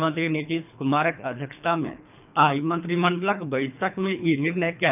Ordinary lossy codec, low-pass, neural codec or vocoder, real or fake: none; 3.6 kHz; codec, 24 kHz, 3 kbps, HILCodec; fake